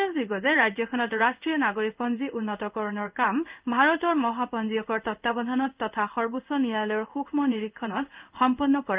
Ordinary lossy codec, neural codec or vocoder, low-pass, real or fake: Opus, 24 kbps; codec, 16 kHz in and 24 kHz out, 1 kbps, XY-Tokenizer; 3.6 kHz; fake